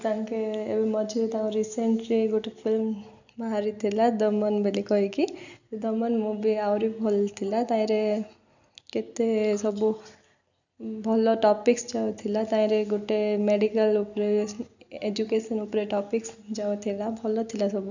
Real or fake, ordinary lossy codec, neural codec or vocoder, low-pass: real; none; none; 7.2 kHz